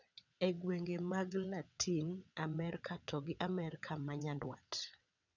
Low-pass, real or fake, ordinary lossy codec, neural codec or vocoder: 7.2 kHz; fake; none; vocoder, 22.05 kHz, 80 mel bands, WaveNeXt